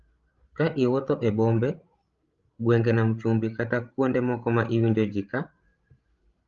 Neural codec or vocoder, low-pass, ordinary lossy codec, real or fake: codec, 16 kHz, 16 kbps, FreqCodec, larger model; 7.2 kHz; Opus, 32 kbps; fake